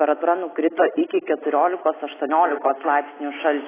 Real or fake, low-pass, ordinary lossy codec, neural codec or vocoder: real; 3.6 kHz; AAC, 16 kbps; none